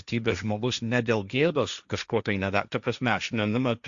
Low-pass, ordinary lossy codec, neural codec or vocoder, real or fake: 7.2 kHz; Opus, 64 kbps; codec, 16 kHz, 1.1 kbps, Voila-Tokenizer; fake